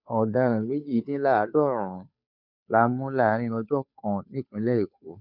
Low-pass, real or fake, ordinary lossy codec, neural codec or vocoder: 5.4 kHz; fake; none; codec, 16 kHz, 2 kbps, FunCodec, trained on Chinese and English, 25 frames a second